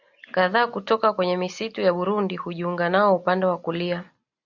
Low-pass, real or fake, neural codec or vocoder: 7.2 kHz; real; none